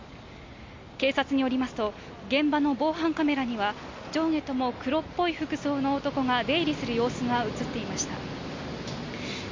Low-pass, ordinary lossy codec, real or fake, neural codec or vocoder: 7.2 kHz; MP3, 64 kbps; real; none